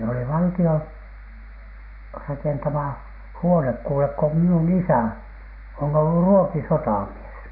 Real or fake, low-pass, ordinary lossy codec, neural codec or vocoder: real; 5.4 kHz; none; none